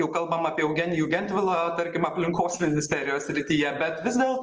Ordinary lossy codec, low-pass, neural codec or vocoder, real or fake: Opus, 24 kbps; 7.2 kHz; none; real